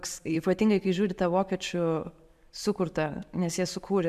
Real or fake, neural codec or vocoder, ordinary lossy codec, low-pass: real; none; AAC, 96 kbps; 14.4 kHz